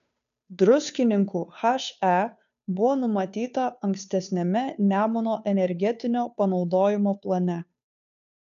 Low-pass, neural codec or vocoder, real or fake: 7.2 kHz; codec, 16 kHz, 2 kbps, FunCodec, trained on Chinese and English, 25 frames a second; fake